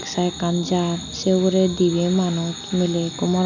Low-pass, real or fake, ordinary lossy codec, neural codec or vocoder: 7.2 kHz; real; none; none